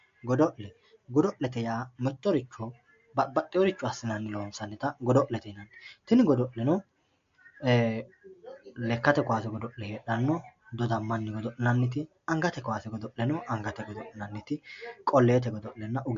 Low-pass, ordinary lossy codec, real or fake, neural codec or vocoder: 7.2 kHz; MP3, 48 kbps; real; none